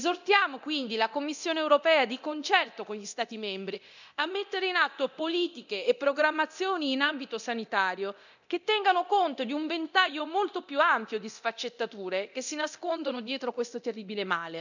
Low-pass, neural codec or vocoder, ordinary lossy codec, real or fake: 7.2 kHz; codec, 24 kHz, 0.9 kbps, DualCodec; none; fake